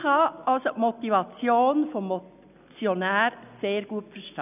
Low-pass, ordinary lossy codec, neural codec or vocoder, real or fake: 3.6 kHz; none; none; real